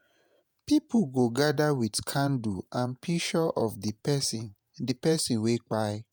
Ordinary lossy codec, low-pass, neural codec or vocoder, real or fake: none; none; vocoder, 48 kHz, 128 mel bands, Vocos; fake